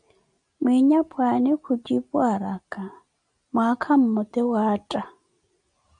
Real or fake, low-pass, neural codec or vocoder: real; 9.9 kHz; none